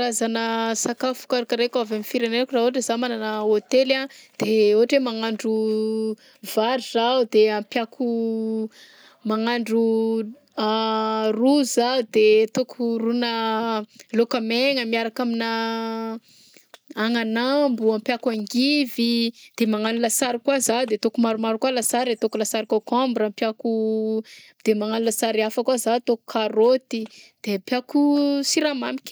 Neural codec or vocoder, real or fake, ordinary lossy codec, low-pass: none; real; none; none